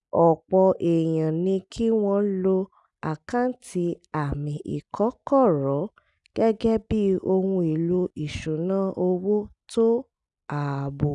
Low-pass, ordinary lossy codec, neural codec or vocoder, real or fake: 10.8 kHz; none; none; real